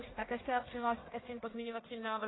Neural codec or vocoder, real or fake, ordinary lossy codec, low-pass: codec, 44.1 kHz, 1.7 kbps, Pupu-Codec; fake; AAC, 16 kbps; 7.2 kHz